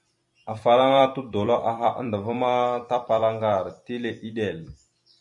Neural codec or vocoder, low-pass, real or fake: vocoder, 44.1 kHz, 128 mel bands every 256 samples, BigVGAN v2; 10.8 kHz; fake